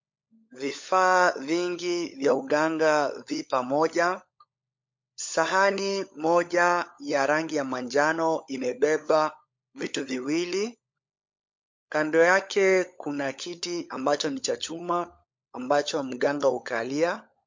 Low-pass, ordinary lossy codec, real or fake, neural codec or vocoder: 7.2 kHz; MP3, 48 kbps; fake; codec, 16 kHz, 16 kbps, FunCodec, trained on LibriTTS, 50 frames a second